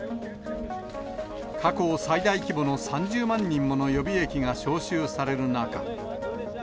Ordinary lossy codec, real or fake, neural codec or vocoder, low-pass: none; real; none; none